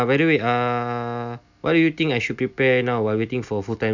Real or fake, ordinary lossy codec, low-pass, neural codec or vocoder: real; none; 7.2 kHz; none